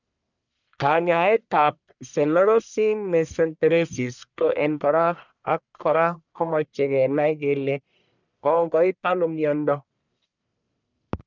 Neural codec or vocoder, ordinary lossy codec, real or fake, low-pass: codec, 44.1 kHz, 1.7 kbps, Pupu-Codec; none; fake; 7.2 kHz